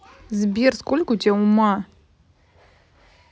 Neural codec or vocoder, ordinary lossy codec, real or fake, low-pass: none; none; real; none